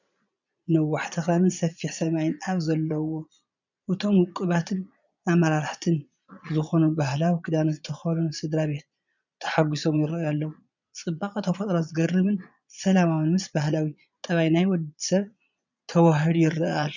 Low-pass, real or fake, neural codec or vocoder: 7.2 kHz; fake; vocoder, 22.05 kHz, 80 mel bands, Vocos